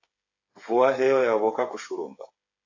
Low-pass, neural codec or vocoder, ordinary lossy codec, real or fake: 7.2 kHz; codec, 16 kHz, 8 kbps, FreqCodec, smaller model; AAC, 48 kbps; fake